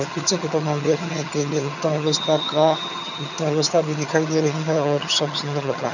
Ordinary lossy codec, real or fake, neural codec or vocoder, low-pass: none; fake; vocoder, 22.05 kHz, 80 mel bands, HiFi-GAN; 7.2 kHz